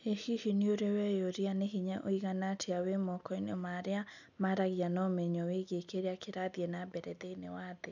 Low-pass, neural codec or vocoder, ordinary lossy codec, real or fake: 7.2 kHz; none; none; real